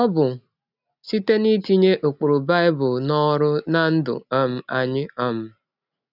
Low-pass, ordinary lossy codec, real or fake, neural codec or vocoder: 5.4 kHz; none; real; none